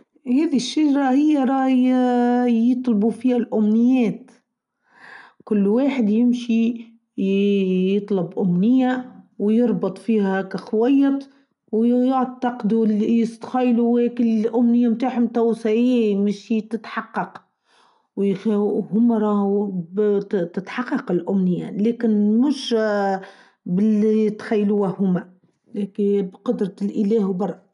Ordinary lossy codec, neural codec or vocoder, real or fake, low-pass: none; none; real; 10.8 kHz